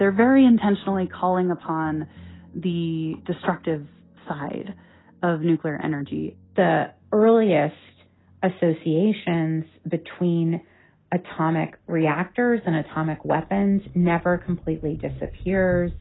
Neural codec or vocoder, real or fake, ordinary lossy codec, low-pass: none; real; AAC, 16 kbps; 7.2 kHz